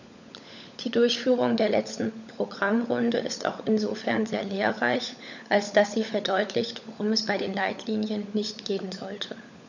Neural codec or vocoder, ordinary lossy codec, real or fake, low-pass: codec, 16 kHz, 16 kbps, FunCodec, trained on LibriTTS, 50 frames a second; none; fake; 7.2 kHz